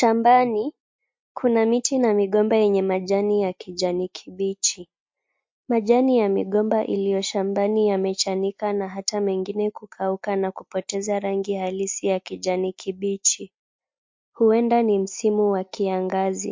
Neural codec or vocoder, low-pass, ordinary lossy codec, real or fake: none; 7.2 kHz; MP3, 48 kbps; real